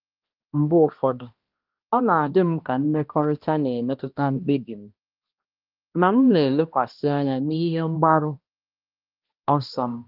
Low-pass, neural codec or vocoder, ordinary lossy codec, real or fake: 5.4 kHz; codec, 16 kHz, 1 kbps, X-Codec, HuBERT features, trained on balanced general audio; Opus, 32 kbps; fake